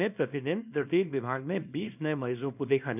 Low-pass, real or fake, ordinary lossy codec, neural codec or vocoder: 3.6 kHz; fake; none; codec, 24 kHz, 0.9 kbps, WavTokenizer, small release